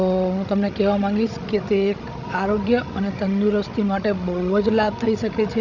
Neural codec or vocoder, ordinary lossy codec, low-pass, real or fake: codec, 16 kHz, 16 kbps, FreqCodec, larger model; none; 7.2 kHz; fake